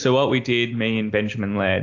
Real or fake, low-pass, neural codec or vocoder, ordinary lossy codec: real; 7.2 kHz; none; AAC, 48 kbps